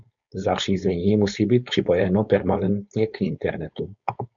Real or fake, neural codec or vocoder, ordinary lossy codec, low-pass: fake; codec, 16 kHz, 4.8 kbps, FACodec; MP3, 96 kbps; 7.2 kHz